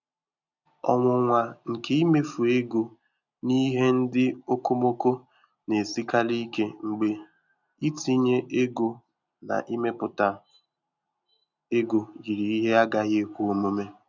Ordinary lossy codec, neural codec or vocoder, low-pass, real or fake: none; none; 7.2 kHz; real